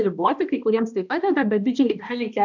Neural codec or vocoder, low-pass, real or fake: codec, 16 kHz, 1 kbps, X-Codec, HuBERT features, trained on balanced general audio; 7.2 kHz; fake